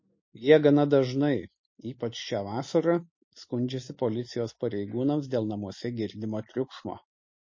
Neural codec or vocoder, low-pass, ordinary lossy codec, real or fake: none; 7.2 kHz; MP3, 32 kbps; real